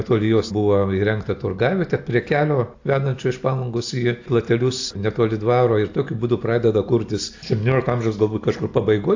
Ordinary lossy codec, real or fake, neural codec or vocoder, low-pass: AAC, 48 kbps; real; none; 7.2 kHz